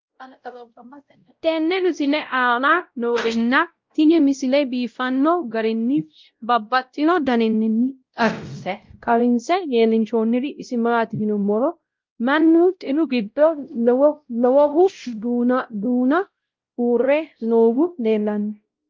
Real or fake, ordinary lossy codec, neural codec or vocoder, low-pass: fake; Opus, 32 kbps; codec, 16 kHz, 0.5 kbps, X-Codec, WavLM features, trained on Multilingual LibriSpeech; 7.2 kHz